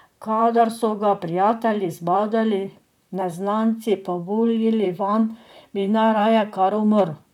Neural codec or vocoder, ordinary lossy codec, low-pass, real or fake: vocoder, 44.1 kHz, 128 mel bands, Pupu-Vocoder; none; 19.8 kHz; fake